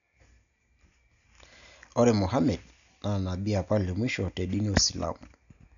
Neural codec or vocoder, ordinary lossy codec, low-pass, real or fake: none; none; 7.2 kHz; real